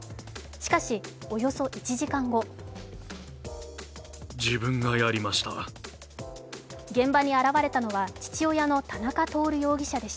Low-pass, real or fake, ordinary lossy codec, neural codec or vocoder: none; real; none; none